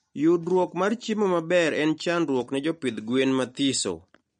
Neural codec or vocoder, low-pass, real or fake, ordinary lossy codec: none; 9.9 kHz; real; MP3, 48 kbps